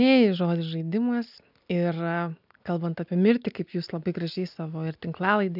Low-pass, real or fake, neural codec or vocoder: 5.4 kHz; real; none